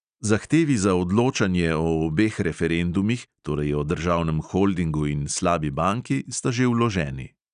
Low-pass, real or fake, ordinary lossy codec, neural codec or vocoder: 9.9 kHz; real; none; none